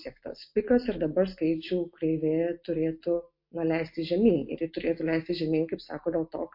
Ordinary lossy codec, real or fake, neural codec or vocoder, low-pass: MP3, 32 kbps; real; none; 5.4 kHz